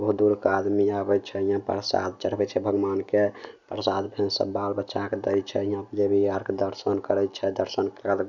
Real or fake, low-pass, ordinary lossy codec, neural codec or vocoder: real; 7.2 kHz; none; none